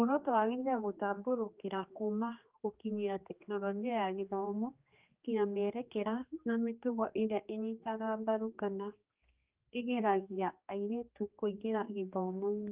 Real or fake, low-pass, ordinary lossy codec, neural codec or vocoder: fake; 3.6 kHz; Opus, 24 kbps; codec, 16 kHz, 2 kbps, X-Codec, HuBERT features, trained on general audio